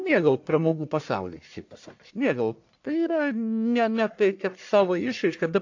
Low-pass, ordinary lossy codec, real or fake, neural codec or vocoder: 7.2 kHz; AAC, 48 kbps; fake; codec, 44.1 kHz, 1.7 kbps, Pupu-Codec